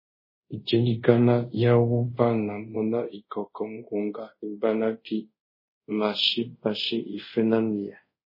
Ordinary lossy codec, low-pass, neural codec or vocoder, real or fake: MP3, 24 kbps; 5.4 kHz; codec, 24 kHz, 0.5 kbps, DualCodec; fake